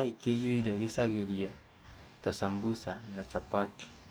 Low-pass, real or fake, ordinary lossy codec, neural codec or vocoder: none; fake; none; codec, 44.1 kHz, 2.6 kbps, DAC